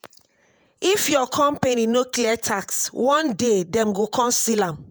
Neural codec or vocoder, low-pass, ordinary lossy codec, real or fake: vocoder, 48 kHz, 128 mel bands, Vocos; none; none; fake